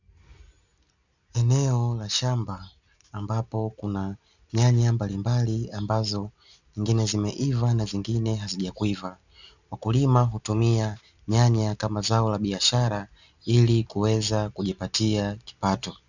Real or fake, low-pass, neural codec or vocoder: real; 7.2 kHz; none